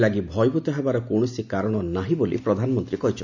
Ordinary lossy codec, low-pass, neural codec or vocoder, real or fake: none; 7.2 kHz; none; real